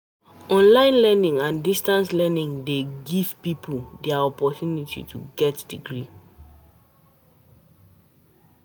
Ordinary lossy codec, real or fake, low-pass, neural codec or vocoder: none; real; none; none